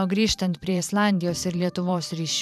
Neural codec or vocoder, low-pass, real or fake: vocoder, 44.1 kHz, 128 mel bands every 512 samples, BigVGAN v2; 14.4 kHz; fake